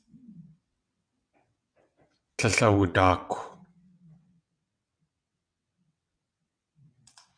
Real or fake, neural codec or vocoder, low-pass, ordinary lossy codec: fake; vocoder, 22.05 kHz, 80 mel bands, WaveNeXt; 9.9 kHz; MP3, 96 kbps